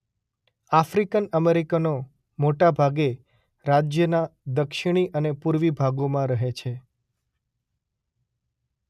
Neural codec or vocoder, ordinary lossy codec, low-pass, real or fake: none; none; 14.4 kHz; real